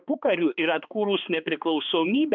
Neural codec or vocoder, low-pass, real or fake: codec, 16 kHz, 2 kbps, X-Codec, HuBERT features, trained on balanced general audio; 7.2 kHz; fake